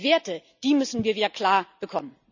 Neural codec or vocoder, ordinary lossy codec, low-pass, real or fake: none; none; 7.2 kHz; real